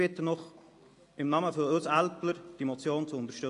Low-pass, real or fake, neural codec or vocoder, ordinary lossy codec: 10.8 kHz; real; none; AAC, 48 kbps